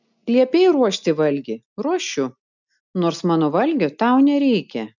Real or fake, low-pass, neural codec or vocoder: real; 7.2 kHz; none